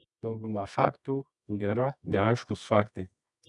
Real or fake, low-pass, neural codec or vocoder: fake; 10.8 kHz; codec, 24 kHz, 0.9 kbps, WavTokenizer, medium music audio release